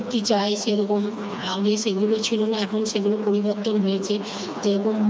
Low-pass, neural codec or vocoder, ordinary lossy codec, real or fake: none; codec, 16 kHz, 2 kbps, FreqCodec, smaller model; none; fake